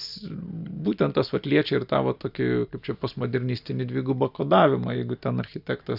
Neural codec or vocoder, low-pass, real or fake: none; 5.4 kHz; real